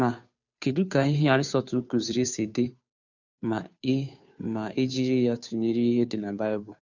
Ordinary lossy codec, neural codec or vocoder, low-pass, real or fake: none; codec, 16 kHz, 2 kbps, FunCodec, trained on Chinese and English, 25 frames a second; 7.2 kHz; fake